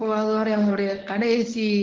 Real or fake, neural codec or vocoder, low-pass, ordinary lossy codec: fake; codec, 24 kHz, 0.9 kbps, WavTokenizer, medium speech release version 1; 7.2 kHz; Opus, 16 kbps